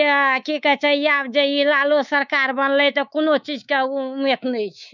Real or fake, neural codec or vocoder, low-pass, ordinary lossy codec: fake; codec, 24 kHz, 3.1 kbps, DualCodec; 7.2 kHz; none